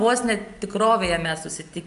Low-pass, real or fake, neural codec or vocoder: 10.8 kHz; real; none